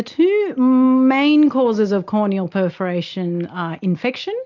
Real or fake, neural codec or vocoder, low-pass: real; none; 7.2 kHz